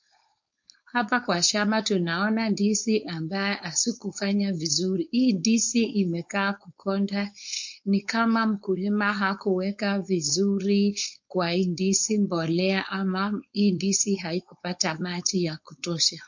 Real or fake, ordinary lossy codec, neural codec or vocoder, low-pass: fake; MP3, 48 kbps; codec, 16 kHz, 4.8 kbps, FACodec; 7.2 kHz